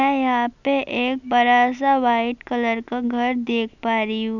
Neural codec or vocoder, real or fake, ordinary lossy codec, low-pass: none; real; none; 7.2 kHz